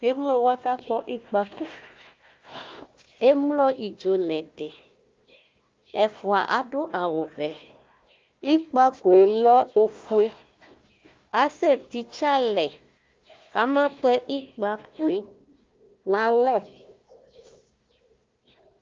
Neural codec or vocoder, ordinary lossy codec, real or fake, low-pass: codec, 16 kHz, 1 kbps, FunCodec, trained on Chinese and English, 50 frames a second; Opus, 24 kbps; fake; 7.2 kHz